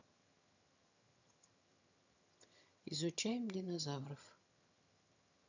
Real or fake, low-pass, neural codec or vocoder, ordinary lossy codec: fake; 7.2 kHz; vocoder, 22.05 kHz, 80 mel bands, HiFi-GAN; none